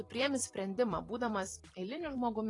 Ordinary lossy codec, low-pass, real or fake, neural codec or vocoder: AAC, 32 kbps; 10.8 kHz; fake; vocoder, 44.1 kHz, 128 mel bands every 256 samples, BigVGAN v2